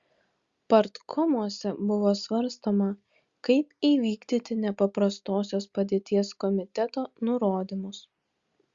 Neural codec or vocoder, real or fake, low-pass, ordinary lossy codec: none; real; 7.2 kHz; Opus, 64 kbps